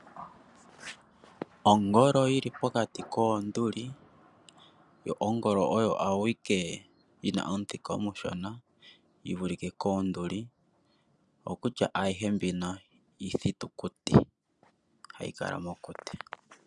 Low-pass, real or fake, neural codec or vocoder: 10.8 kHz; real; none